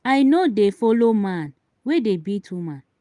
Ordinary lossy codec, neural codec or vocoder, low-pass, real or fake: Opus, 32 kbps; none; 10.8 kHz; real